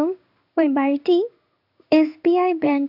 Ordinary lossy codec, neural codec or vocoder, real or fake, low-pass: none; codec, 16 kHz in and 24 kHz out, 1 kbps, XY-Tokenizer; fake; 5.4 kHz